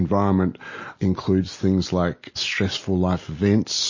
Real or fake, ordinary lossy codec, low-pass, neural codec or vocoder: real; MP3, 32 kbps; 7.2 kHz; none